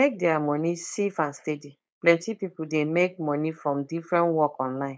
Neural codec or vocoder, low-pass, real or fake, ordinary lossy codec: codec, 16 kHz, 4.8 kbps, FACodec; none; fake; none